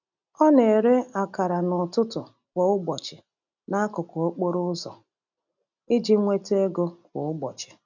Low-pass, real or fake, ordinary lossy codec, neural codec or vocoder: 7.2 kHz; real; none; none